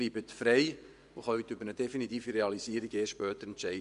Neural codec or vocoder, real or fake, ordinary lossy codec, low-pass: vocoder, 24 kHz, 100 mel bands, Vocos; fake; none; 10.8 kHz